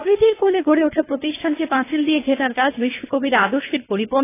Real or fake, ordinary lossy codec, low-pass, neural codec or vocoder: fake; AAC, 16 kbps; 3.6 kHz; codec, 24 kHz, 3 kbps, HILCodec